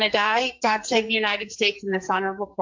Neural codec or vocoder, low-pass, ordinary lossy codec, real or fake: codec, 44.1 kHz, 2.6 kbps, SNAC; 7.2 kHz; MP3, 48 kbps; fake